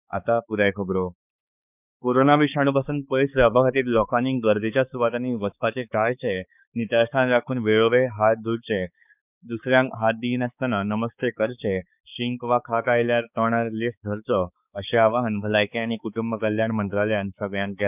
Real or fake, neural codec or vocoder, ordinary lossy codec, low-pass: fake; codec, 16 kHz, 4 kbps, X-Codec, HuBERT features, trained on balanced general audio; none; 3.6 kHz